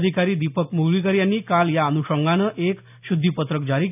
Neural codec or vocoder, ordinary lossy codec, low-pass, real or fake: none; none; 3.6 kHz; real